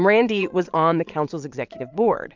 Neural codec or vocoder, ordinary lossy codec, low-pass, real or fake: vocoder, 22.05 kHz, 80 mel bands, Vocos; MP3, 64 kbps; 7.2 kHz; fake